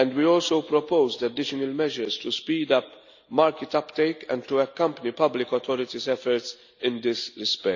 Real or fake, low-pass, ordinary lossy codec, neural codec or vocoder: real; 7.2 kHz; none; none